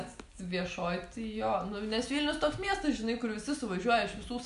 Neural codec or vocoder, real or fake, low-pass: none; real; 10.8 kHz